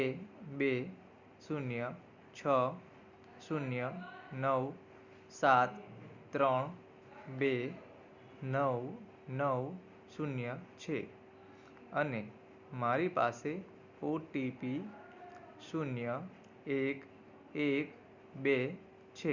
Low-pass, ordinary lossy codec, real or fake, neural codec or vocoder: 7.2 kHz; Opus, 64 kbps; real; none